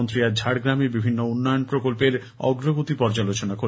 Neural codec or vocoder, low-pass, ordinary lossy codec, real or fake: none; none; none; real